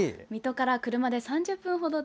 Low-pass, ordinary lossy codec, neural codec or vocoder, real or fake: none; none; none; real